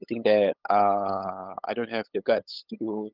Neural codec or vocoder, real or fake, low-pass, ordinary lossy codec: codec, 16 kHz, 8 kbps, FunCodec, trained on LibriTTS, 25 frames a second; fake; 5.4 kHz; Opus, 32 kbps